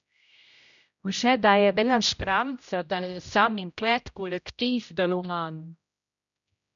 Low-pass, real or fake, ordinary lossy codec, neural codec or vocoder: 7.2 kHz; fake; MP3, 96 kbps; codec, 16 kHz, 0.5 kbps, X-Codec, HuBERT features, trained on general audio